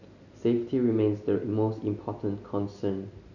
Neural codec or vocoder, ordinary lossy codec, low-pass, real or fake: none; none; 7.2 kHz; real